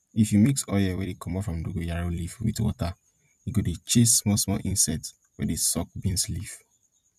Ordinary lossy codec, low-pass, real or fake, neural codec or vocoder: MP3, 96 kbps; 14.4 kHz; fake; vocoder, 44.1 kHz, 128 mel bands every 256 samples, BigVGAN v2